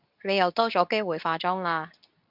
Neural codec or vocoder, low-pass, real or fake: codec, 24 kHz, 0.9 kbps, WavTokenizer, medium speech release version 2; 5.4 kHz; fake